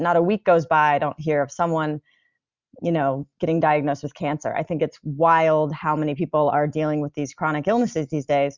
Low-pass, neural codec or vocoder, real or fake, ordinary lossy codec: 7.2 kHz; none; real; Opus, 64 kbps